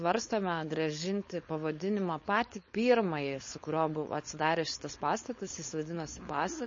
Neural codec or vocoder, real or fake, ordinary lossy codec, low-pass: codec, 16 kHz, 4.8 kbps, FACodec; fake; MP3, 32 kbps; 7.2 kHz